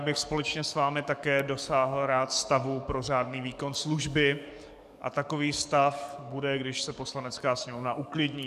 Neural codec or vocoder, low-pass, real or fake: codec, 44.1 kHz, 7.8 kbps, DAC; 14.4 kHz; fake